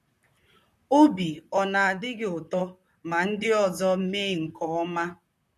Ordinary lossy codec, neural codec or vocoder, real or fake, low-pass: MP3, 64 kbps; vocoder, 44.1 kHz, 128 mel bands, Pupu-Vocoder; fake; 14.4 kHz